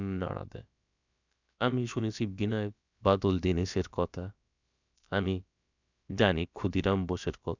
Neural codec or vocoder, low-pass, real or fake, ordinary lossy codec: codec, 16 kHz, about 1 kbps, DyCAST, with the encoder's durations; 7.2 kHz; fake; none